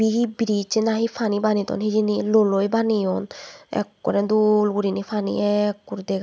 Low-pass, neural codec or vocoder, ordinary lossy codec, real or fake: none; none; none; real